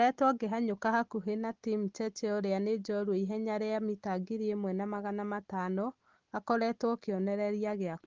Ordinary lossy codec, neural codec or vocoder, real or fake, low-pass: Opus, 16 kbps; none; real; 7.2 kHz